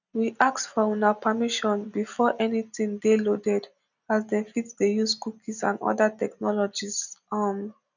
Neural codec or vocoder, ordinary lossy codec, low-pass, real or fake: none; none; 7.2 kHz; real